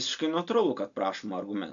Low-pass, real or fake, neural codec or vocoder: 7.2 kHz; real; none